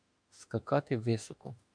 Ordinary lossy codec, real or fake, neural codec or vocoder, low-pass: MP3, 64 kbps; fake; autoencoder, 48 kHz, 32 numbers a frame, DAC-VAE, trained on Japanese speech; 9.9 kHz